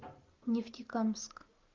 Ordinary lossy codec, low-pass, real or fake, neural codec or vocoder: Opus, 32 kbps; 7.2 kHz; real; none